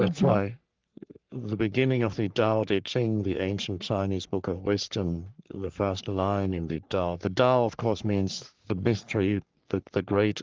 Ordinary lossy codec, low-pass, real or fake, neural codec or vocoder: Opus, 32 kbps; 7.2 kHz; fake; codec, 44.1 kHz, 3.4 kbps, Pupu-Codec